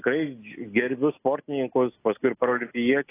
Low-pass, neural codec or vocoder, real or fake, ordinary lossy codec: 3.6 kHz; none; real; AAC, 24 kbps